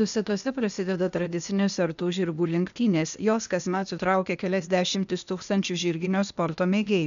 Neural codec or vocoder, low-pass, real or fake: codec, 16 kHz, 0.8 kbps, ZipCodec; 7.2 kHz; fake